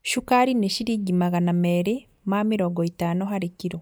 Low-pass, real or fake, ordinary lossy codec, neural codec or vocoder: none; real; none; none